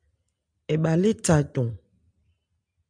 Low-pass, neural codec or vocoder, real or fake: 9.9 kHz; none; real